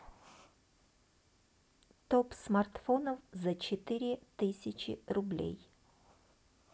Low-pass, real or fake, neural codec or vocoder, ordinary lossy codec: none; real; none; none